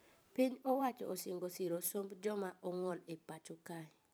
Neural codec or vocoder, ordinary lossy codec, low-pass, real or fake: vocoder, 44.1 kHz, 128 mel bands every 512 samples, BigVGAN v2; none; none; fake